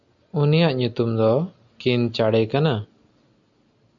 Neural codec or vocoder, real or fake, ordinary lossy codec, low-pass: none; real; MP3, 96 kbps; 7.2 kHz